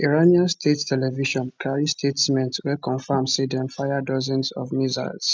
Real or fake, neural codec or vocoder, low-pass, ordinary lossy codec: real; none; none; none